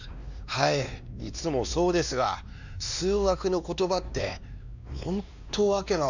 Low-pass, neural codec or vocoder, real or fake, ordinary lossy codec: 7.2 kHz; codec, 16 kHz, 2 kbps, X-Codec, WavLM features, trained on Multilingual LibriSpeech; fake; none